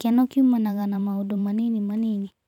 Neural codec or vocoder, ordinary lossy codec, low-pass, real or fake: vocoder, 44.1 kHz, 128 mel bands every 512 samples, BigVGAN v2; none; 19.8 kHz; fake